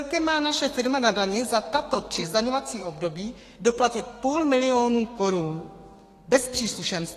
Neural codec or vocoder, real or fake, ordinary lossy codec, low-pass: codec, 32 kHz, 1.9 kbps, SNAC; fake; AAC, 48 kbps; 14.4 kHz